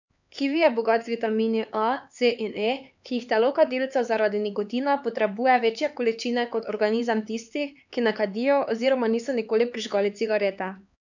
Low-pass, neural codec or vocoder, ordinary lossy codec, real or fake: 7.2 kHz; codec, 16 kHz, 4 kbps, X-Codec, HuBERT features, trained on LibriSpeech; none; fake